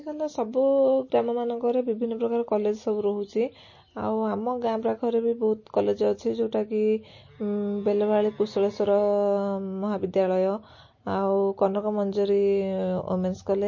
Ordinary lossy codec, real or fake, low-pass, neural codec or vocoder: MP3, 32 kbps; real; 7.2 kHz; none